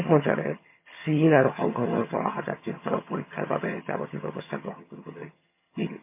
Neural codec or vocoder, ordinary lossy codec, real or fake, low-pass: vocoder, 22.05 kHz, 80 mel bands, HiFi-GAN; MP3, 24 kbps; fake; 3.6 kHz